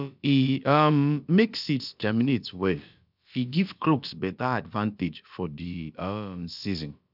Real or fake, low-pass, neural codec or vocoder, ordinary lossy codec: fake; 5.4 kHz; codec, 16 kHz, about 1 kbps, DyCAST, with the encoder's durations; none